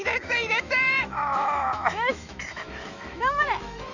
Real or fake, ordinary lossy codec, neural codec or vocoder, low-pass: fake; none; autoencoder, 48 kHz, 128 numbers a frame, DAC-VAE, trained on Japanese speech; 7.2 kHz